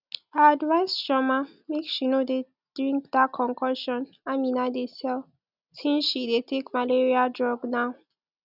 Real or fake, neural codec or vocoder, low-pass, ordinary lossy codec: real; none; 5.4 kHz; none